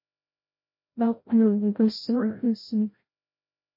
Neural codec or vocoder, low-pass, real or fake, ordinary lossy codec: codec, 16 kHz, 0.5 kbps, FreqCodec, larger model; 5.4 kHz; fake; MP3, 32 kbps